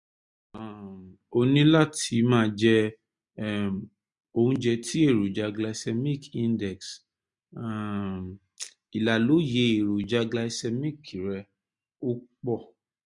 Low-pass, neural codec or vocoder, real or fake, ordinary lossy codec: 10.8 kHz; none; real; MP3, 64 kbps